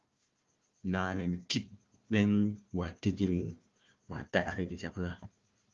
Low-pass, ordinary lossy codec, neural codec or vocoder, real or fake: 7.2 kHz; Opus, 32 kbps; codec, 16 kHz, 1 kbps, FunCodec, trained on Chinese and English, 50 frames a second; fake